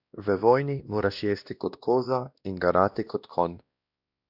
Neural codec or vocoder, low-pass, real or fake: codec, 16 kHz, 1 kbps, X-Codec, WavLM features, trained on Multilingual LibriSpeech; 5.4 kHz; fake